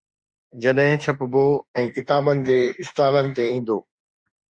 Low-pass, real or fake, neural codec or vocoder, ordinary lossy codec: 9.9 kHz; fake; autoencoder, 48 kHz, 32 numbers a frame, DAC-VAE, trained on Japanese speech; Opus, 64 kbps